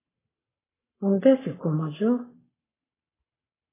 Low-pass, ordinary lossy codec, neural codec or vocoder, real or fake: 3.6 kHz; AAC, 24 kbps; codec, 44.1 kHz, 7.8 kbps, Pupu-Codec; fake